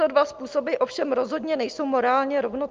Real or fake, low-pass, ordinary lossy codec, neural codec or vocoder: real; 7.2 kHz; Opus, 32 kbps; none